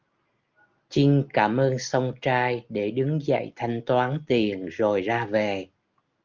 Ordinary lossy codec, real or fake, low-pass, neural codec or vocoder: Opus, 32 kbps; real; 7.2 kHz; none